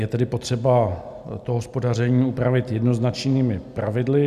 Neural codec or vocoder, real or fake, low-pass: none; real; 14.4 kHz